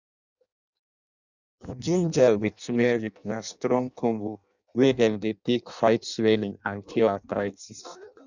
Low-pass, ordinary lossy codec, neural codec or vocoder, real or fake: 7.2 kHz; none; codec, 16 kHz in and 24 kHz out, 0.6 kbps, FireRedTTS-2 codec; fake